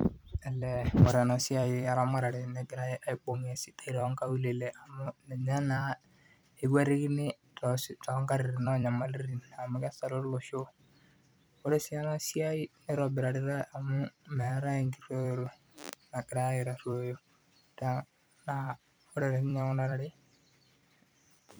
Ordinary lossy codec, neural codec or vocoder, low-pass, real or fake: none; vocoder, 44.1 kHz, 128 mel bands every 256 samples, BigVGAN v2; none; fake